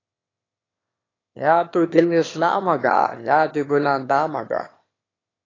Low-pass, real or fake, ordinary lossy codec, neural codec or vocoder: 7.2 kHz; fake; AAC, 32 kbps; autoencoder, 22.05 kHz, a latent of 192 numbers a frame, VITS, trained on one speaker